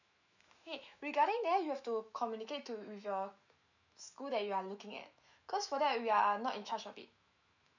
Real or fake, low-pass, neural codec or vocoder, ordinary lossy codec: fake; 7.2 kHz; autoencoder, 48 kHz, 128 numbers a frame, DAC-VAE, trained on Japanese speech; none